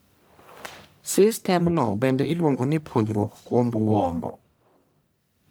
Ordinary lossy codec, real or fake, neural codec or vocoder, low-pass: none; fake; codec, 44.1 kHz, 1.7 kbps, Pupu-Codec; none